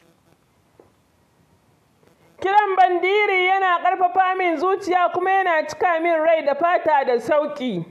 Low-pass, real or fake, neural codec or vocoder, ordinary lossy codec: 14.4 kHz; real; none; AAC, 96 kbps